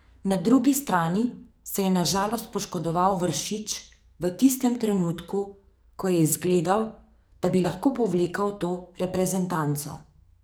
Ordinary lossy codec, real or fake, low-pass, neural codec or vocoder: none; fake; none; codec, 44.1 kHz, 2.6 kbps, SNAC